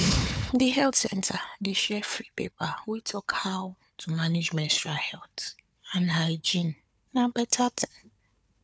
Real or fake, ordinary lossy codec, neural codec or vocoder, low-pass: fake; none; codec, 16 kHz, 4 kbps, FunCodec, trained on Chinese and English, 50 frames a second; none